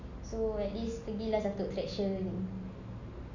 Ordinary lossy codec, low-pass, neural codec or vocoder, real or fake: none; 7.2 kHz; none; real